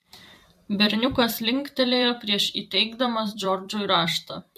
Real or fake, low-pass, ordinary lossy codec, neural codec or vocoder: fake; 14.4 kHz; MP3, 64 kbps; vocoder, 44.1 kHz, 128 mel bands every 256 samples, BigVGAN v2